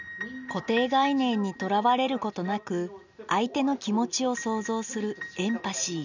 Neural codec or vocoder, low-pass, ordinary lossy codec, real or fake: none; 7.2 kHz; none; real